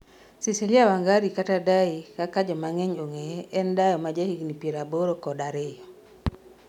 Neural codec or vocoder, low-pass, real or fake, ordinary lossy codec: none; 19.8 kHz; real; none